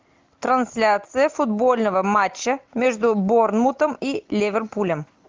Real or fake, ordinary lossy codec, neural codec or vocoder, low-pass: real; Opus, 32 kbps; none; 7.2 kHz